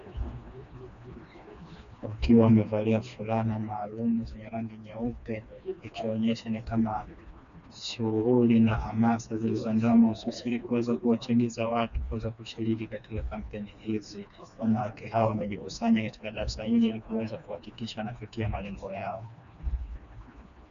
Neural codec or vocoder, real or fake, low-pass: codec, 16 kHz, 2 kbps, FreqCodec, smaller model; fake; 7.2 kHz